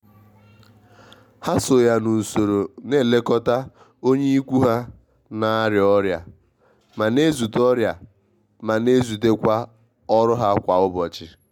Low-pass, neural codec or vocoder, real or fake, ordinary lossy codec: 19.8 kHz; none; real; MP3, 96 kbps